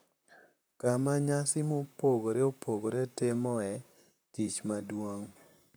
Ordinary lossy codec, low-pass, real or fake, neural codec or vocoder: none; none; fake; vocoder, 44.1 kHz, 128 mel bands, Pupu-Vocoder